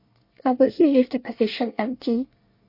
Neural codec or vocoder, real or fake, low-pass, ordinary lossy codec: codec, 24 kHz, 1 kbps, SNAC; fake; 5.4 kHz; MP3, 32 kbps